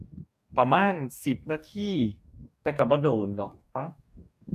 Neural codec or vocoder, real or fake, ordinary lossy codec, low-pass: codec, 44.1 kHz, 2.6 kbps, DAC; fake; AAC, 96 kbps; 14.4 kHz